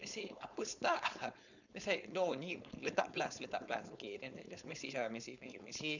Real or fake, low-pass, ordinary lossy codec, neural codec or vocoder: fake; 7.2 kHz; none; codec, 16 kHz, 4.8 kbps, FACodec